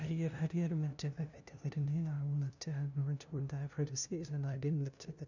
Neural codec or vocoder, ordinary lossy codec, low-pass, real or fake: codec, 16 kHz, 0.5 kbps, FunCodec, trained on LibriTTS, 25 frames a second; none; 7.2 kHz; fake